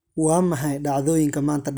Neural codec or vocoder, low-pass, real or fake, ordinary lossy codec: none; none; real; none